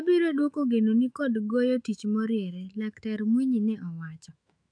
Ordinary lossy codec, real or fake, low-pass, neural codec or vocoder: AAC, 64 kbps; fake; 9.9 kHz; autoencoder, 48 kHz, 128 numbers a frame, DAC-VAE, trained on Japanese speech